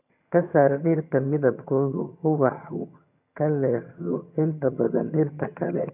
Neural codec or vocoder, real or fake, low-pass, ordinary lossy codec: vocoder, 22.05 kHz, 80 mel bands, HiFi-GAN; fake; 3.6 kHz; none